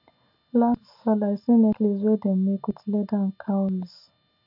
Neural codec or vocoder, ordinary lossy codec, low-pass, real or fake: none; none; 5.4 kHz; real